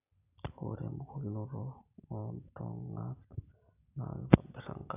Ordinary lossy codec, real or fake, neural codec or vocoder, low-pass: none; real; none; 3.6 kHz